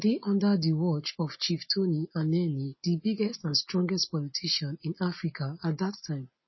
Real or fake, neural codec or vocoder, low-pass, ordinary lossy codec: real; none; 7.2 kHz; MP3, 24 kbps